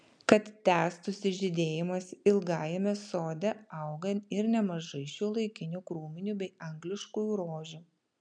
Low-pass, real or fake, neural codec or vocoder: 9.9 kHz; real; none